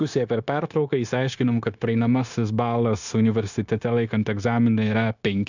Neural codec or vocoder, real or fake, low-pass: codec, 16 kHz, 0.9 kbps, LongCat-Audio-Codec; fake; 7.2 kHz